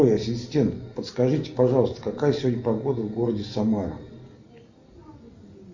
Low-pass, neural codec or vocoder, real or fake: 7.2 kHz; none; real